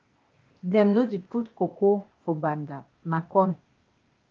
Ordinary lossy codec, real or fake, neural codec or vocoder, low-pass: Opus, 24 kbps; fake; codec, 16 kHz, 0.8 kbps, ZipCodec; 7.2 kHz